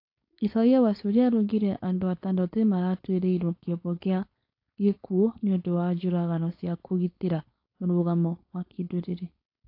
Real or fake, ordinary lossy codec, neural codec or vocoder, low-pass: fake; AAC, 32 kbps; codec, 16 kHz, 4.8 kbps, FACodec; 5.4 kHz